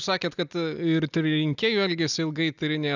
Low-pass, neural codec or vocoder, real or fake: 7.2 kHz; none; real